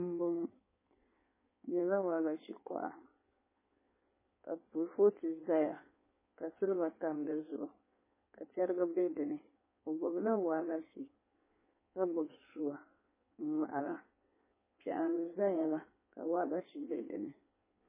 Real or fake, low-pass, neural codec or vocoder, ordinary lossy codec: fake; 3.6 kHz; codec, 16 kHz in and 24 kHz out, 1.1 kbps, FireRedTTS-2 codec; MP3, 16 kbps